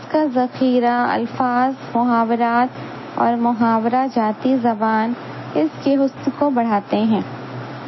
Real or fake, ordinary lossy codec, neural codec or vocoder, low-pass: real; MP3, 24 kbps; none; 7.2 kHz